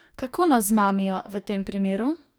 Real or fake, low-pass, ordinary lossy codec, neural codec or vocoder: fake; none; none; codec, 44.1 kHz, 2.6 kbps, DAC